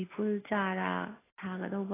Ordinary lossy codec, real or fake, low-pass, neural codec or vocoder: AAC, 16 kbps; real; 3.6 kHz; none